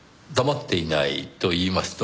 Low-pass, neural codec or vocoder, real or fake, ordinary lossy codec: none; none; real; none